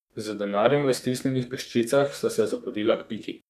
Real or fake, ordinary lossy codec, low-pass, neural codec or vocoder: fake; none; 14.4 kHz; codec, 32 kHz, 1.9 kbps, SNAC